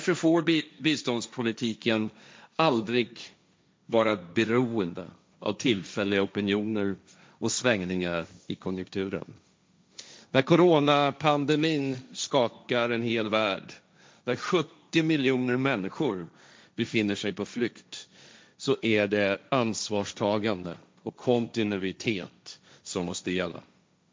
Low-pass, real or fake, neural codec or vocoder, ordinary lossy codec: none; fake; codec, 16 kHz, 1.1 kbps, Voila-Tokenizer; none